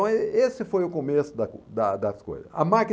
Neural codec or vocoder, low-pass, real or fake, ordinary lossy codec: none; none; real; none